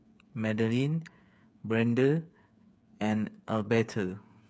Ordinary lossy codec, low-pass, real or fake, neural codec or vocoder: none; none; fake; codec, 16 kHz, 8 kbps, FreqCodec, smaller model